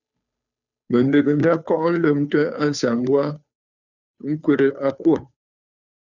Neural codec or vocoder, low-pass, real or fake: codec, 16 kHz, 2 kbps, FunCodec, trained on Chinese and English, 25 frames a second; 7.2 kHz; fake